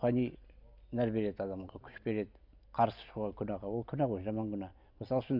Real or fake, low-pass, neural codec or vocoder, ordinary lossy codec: real; 5.4 kHz; none; none